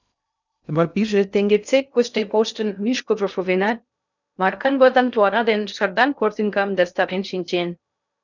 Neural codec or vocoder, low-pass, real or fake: codec, 16 kHz in and 24 kHz out, 0.6 kbps, FocalCodec, streaming, 2048 codes; 7.2 kHz; fake